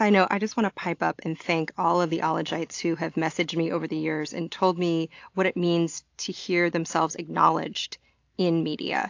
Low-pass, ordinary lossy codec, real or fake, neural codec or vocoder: 7.2 kHz; AAC, 48 kbps; real; none